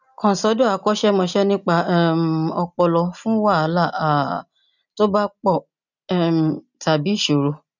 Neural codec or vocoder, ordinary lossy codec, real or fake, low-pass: none; none; real; 7.2 kHz